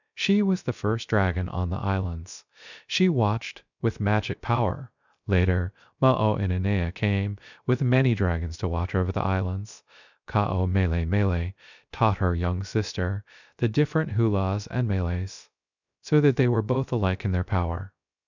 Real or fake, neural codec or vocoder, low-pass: fake; codec, 16 kHz, 0.3 kbps, FocalCodec; 7.2 kHz